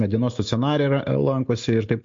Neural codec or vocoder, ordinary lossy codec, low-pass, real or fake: none; MP3, 48 kbps; 7.2 kHz; real